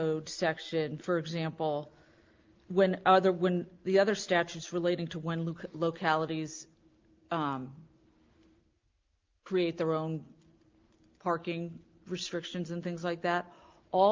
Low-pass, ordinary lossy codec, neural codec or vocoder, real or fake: 7.2 kHz; Opus, 24 kbps; none; real